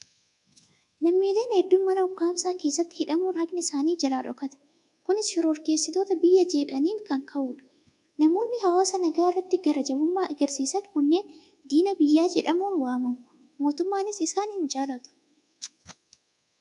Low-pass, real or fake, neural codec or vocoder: 10.8 kHz; fake; codec, 24 kHz, 1.2 kbps, DualCodec